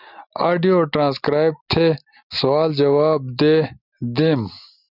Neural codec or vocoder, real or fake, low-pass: none; real; 5.4 kHz